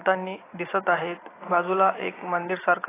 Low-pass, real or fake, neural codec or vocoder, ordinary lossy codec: 3.6 kHz; real; none; AAC, 16 kbps